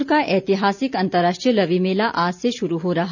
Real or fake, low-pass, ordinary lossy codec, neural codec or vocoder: real; 7.2 kHz; none; none